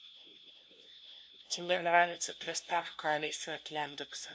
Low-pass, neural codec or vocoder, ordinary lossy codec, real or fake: none; codec, 16 kHz, 0.5 kbps, FunCodec, trained on LibriTTS, 25 frames a second; none; fake